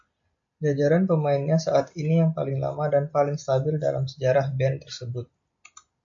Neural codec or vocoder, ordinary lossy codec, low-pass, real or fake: none; MP3, 48 kbps; 7.2 kHz; real